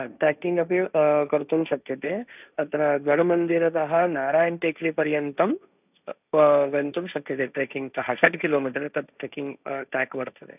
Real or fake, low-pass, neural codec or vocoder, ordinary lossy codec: fake; 3.6 kHz; codec, 16 kHz, 1.1 kbps, Voila-Tokenizer; none